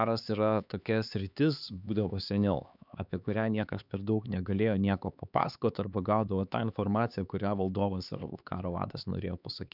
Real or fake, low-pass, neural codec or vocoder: fake; 5.4 kHz; codec, 16 kHz, 4 kbps, X-Codec, HuBERT features, trained on LibriSpeech